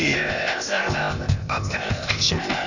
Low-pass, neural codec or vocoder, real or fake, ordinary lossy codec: 7.2 kHz; codec, 16 kHz, 0.8 kbps, ZipCodec; fake; none